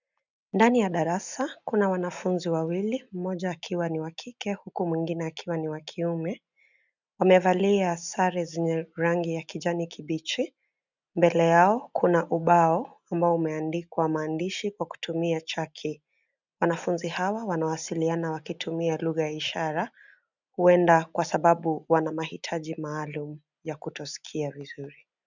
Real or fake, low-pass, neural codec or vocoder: real; 7.2 kHz; none